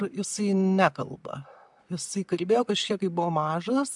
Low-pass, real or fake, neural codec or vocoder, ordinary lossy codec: 10.8 kHz; real; none; MP3, 96 kbps